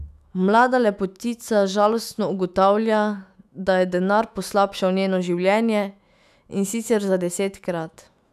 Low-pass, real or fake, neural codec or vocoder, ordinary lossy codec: 14.4 kHz; fake; autoencoder, 48 kHz, 128 numbers a frame, DAC-VAE, trained on Japanese speech; none